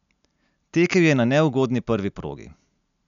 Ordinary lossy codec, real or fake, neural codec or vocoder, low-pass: none; real; none; 7.2 kHz